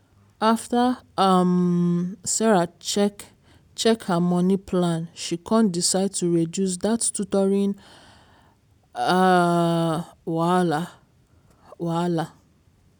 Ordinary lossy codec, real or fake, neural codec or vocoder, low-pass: none; real; none; 19.8 kHz